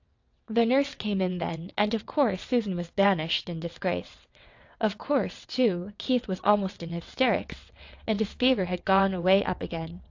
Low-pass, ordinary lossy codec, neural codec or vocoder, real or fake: 7.2 kHz; AAC, 48 kbps; vocoder, 22.05 kHz, 80 mel bands, WaveNeXt; fake